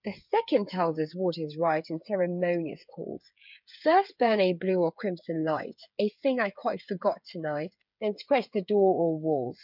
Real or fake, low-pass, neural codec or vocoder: fake; 5.4 kHz; codec, 16 kHz, 8 kbps, FreqCodec, smaller model